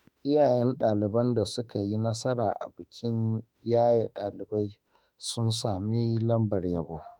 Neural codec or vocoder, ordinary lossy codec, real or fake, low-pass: autoencoder, 48 kHz, 32 numbers a frame, DAC-VAE, trained on Japanese speech; none; fake; 19.8 kHz